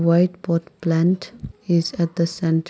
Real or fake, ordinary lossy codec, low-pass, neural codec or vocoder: real; none; none; none